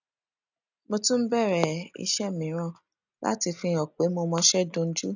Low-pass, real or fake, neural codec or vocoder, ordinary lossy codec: 7.2 kHz; real; none; none